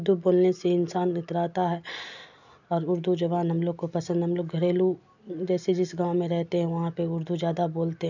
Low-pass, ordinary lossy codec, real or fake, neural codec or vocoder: 7.2 kHz; none; real; none